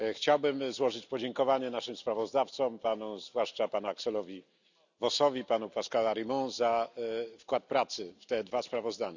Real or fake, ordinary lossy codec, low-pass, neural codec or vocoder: real; none; 7.2 kHz; none